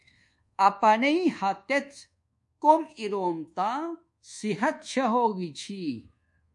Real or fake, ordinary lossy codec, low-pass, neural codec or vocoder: fake; MP3, 48 kbps; 10.8 kHz; codec, 24 kHz, 1.2 kbps, DualCodec